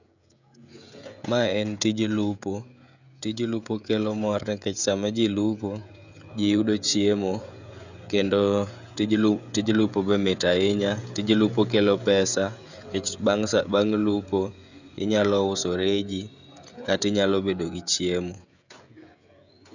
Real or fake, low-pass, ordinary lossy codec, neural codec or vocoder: fake; 7.2 kHz; none; codec, 16 kHz, 16 kbps, FreqCodec, smaller model